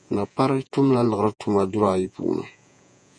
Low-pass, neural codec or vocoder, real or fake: 9.9 kHz; vocoder, 48 kHz, 128 mel bands, Vocos; fake